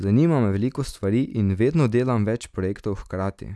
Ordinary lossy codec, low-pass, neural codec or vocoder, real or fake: none; none; none; real